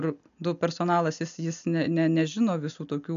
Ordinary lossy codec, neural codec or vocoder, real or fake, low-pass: AAC, 96 kbps; none; real; 7.2 kHz